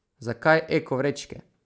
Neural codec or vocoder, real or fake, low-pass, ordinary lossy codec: none; real; none; none